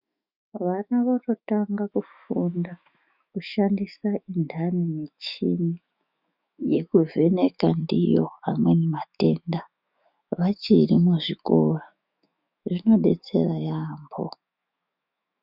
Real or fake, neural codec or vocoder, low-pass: fake; autoencoder, 48 kHz, 128 numbers a frame, DAC-VAE, trained on Japanese speech; 5.4 kHz